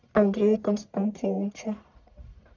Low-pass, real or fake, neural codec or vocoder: 7.2 kHz; fake; codec, 44.1 kHz, 1.7 kbps, Pupu-Codec